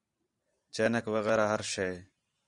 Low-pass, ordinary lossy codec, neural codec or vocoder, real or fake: 10.8 kHz; Opus, 64 kbps; vocoder, 44.1 kHz, 128 mel bands every 512 samples, BigVGAN v2; fake